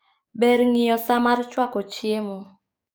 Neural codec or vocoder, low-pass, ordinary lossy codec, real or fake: codec, 44.1 kHz, 7.8 kbps, DAC; none; none; fake